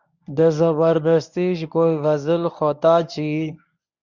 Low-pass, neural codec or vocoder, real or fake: 7.2 kHz; codec, 24 kHz, 0.9 kbps, WavTokenizer, medium speech release version 1; fake